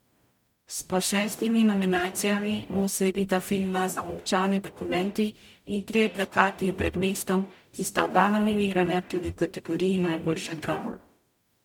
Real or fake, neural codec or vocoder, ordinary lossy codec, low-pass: fake; codec, 44.1 kHz, 0.9 kbps, DAC; MP3, 96 kbps; 19.8 kHz